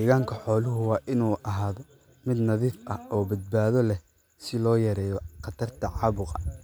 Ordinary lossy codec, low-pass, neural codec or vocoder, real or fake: none; none; none; real